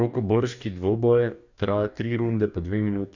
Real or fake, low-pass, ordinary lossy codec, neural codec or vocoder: fake; 7.2 kHz; none; codec, 44.1 kHz, 2.6 kbps, DAC